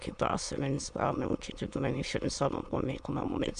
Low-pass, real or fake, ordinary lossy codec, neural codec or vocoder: 9.9 kHz; fake; MP3, 64 kbps; autoencoder, 22.05 kHz, a latent of 192 numbers a frame, VITS, trained on many speakers